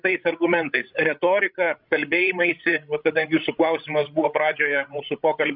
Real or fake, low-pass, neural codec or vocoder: fake; 5.4 kHz; codec, 16 kHz, 16 kbps, FreqCodec, larger model